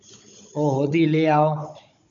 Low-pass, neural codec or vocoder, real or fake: 7.2 kHz; codec, 16 kHz, 16 kbps, FunCodec, trained on Chinese and English, 50 frames a second; fake